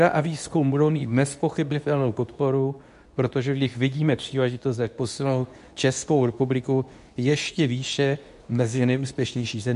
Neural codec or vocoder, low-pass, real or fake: codec, 24 kHz, 0.9 kbps, WavTokenizer, medium speech release version 2; 10.8 kHz; fake